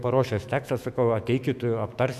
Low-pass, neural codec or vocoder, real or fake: 14.4 kHz; autoencoder, 48 kHz, 128 numbers a frame, DAC-VAE, trained on Japanese speech; fake